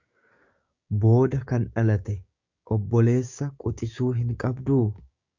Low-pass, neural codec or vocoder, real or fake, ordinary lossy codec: 7.2 kHz; codec, 16 kHz, 6 kbps, DAC; fake; Opus, 64 kbps